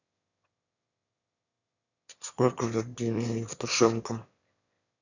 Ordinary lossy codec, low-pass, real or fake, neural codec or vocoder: none; 7.2 kHz; fake; autoencoder, 22.05 kHz, a latent of 192 numbers a frame, VITS, trained on one speaker